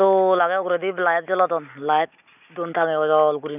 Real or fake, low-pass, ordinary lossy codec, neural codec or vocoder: real; 3.6 kHz; none; none